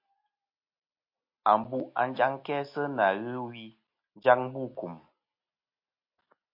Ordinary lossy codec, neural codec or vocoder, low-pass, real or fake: MP3, 32 kbps; none; 5.4 kHz; real